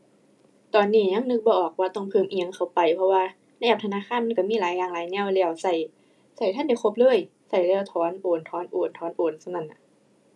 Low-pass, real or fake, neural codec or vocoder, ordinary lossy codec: none; real; none; none